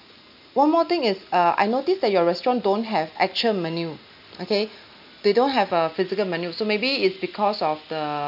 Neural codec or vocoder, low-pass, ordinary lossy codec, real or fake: none; 5.4 kHz; none; real